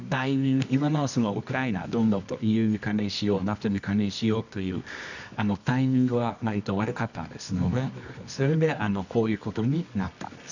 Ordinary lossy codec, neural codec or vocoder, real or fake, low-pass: none; codec, 24 kHz, 0.9 kbps, WavTokenizer, medium music audio release; fake; 7.2 kHz